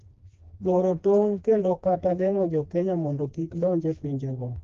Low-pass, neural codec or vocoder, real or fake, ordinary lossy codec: 7.2 kHz; codec, 16 kHz, 2 kbps, FreqCodec, smaller model; fake; Opus, 32 kbps